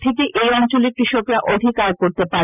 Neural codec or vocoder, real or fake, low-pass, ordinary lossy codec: none; real; 3.6 kHz; none